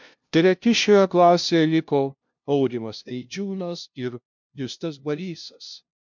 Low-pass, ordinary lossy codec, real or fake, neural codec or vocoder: 7.2 kHz; MP3, 64 kbps; fake; codec, 16 kHz, 0.5 kbps, FunCodec, trained on LibriTTS, 25 frames a second